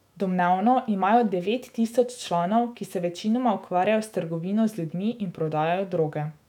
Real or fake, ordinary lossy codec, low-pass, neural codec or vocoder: fake; none; 19.8 kHz; autoencoder, 48 kHz, 128 numbers a frame, DAC-VAE, trained on Japanese speech